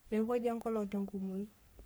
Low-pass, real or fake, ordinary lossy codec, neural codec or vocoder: none; fake; none; codec, 44.1 kHz, 3.4 kbps, Pupu-Codec